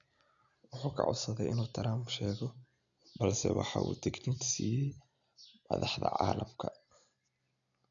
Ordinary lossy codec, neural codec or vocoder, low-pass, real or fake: none; none; 7.2 kHz; real